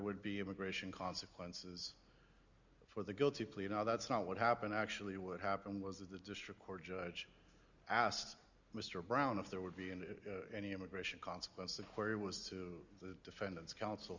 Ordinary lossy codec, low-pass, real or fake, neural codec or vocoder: Opus, 64 kbps; 7.2 kHz; real; none